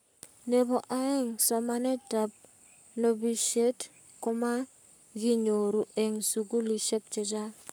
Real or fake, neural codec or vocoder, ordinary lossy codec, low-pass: fake; codec, 44.1 kHz, 7.8 kbps, Pupu-Codec; none; none